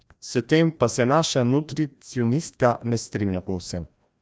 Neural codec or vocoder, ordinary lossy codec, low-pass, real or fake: codec, 16 kHz, 1 kbps, FreqCodec, larger model; none; none; fake